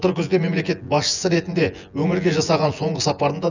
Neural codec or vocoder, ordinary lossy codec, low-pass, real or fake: vocoder, 24 kHz, 100 mel bands, Vocos; none; 7.2 kHz; fake